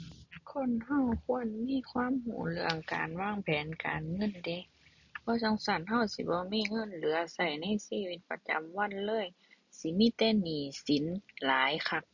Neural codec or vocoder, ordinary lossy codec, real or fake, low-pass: none; none; real; 7.2 kHz